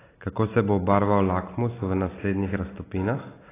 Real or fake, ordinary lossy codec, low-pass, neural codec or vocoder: real; AAC, 16 kbps; 3.6 kHz; none